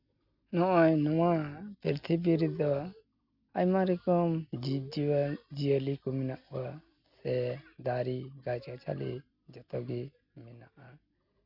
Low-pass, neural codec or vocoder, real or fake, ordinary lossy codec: 5.4 kHz; none; real; none